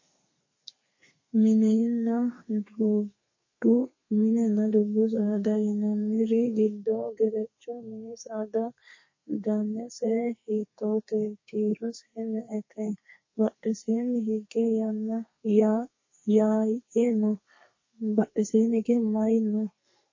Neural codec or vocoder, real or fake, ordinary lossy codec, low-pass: codec, 32 kHz, 1.9 kbps, SNAC; fake; MP3, 32 kbps; 7.2 kHz